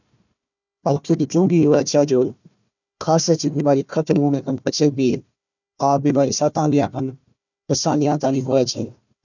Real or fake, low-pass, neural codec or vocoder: fake; 7.2 kHz; codec, 16 kHz, 1 kbps, FunCodec, trained on Chinese and English, 50 frames a second